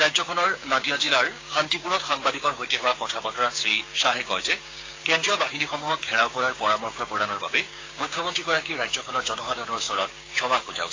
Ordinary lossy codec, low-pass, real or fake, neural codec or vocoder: AAC, 32 kbps; 7.2 kHz; fake; codec, 16 kHz, 6 kbps, DAC